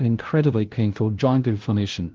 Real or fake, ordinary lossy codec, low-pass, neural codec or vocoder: fake; Opus, 16 kbps; 7.2 kHz; codec, 16 kHz, 0.5 kbps, FunCodec, trained on LibriTTS, 25 frames a second